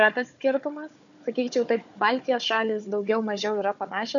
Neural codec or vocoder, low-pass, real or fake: codec, 16 kHz, 4 kbps, FunCodec, trained on Chinese and English, 50 frames a second; 7.2 kHz; fake